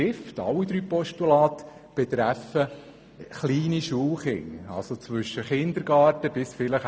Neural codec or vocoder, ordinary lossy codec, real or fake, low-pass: none; none; real; none